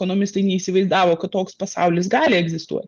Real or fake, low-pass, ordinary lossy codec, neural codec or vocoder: real; 7.2 kHz; Opus, 32 kbps; none